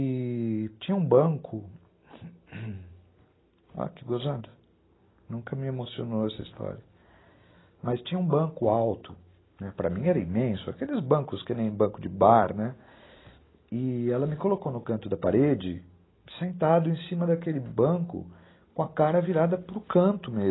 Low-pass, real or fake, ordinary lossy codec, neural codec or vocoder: 7.2 kHz; real; AAC, 16 kbps; none